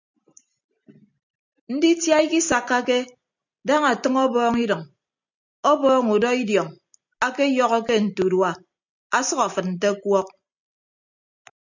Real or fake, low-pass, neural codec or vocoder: real; 7.2 kHz; none